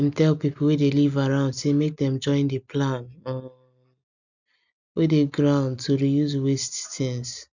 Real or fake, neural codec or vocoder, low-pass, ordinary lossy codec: real; none; 7.2 kHz; none